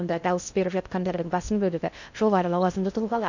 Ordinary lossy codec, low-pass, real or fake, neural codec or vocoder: none; 7.2 kHz; fake; codec, 16 kHz in and 24 kHz out, 0.6 kbps, FocalCodec, streaming, 2048 codes